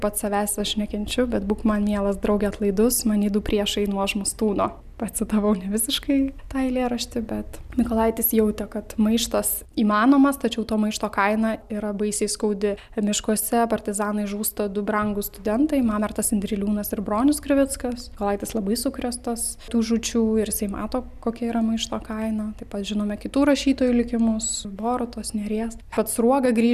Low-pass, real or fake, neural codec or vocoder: 14.4 kHz; real; none